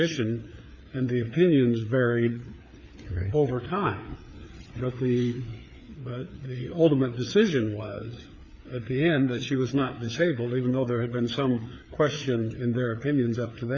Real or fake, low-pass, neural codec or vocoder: fake; 7.2 kHz; codec, 16 kHz, 4 kbps, FreqCodec, larger model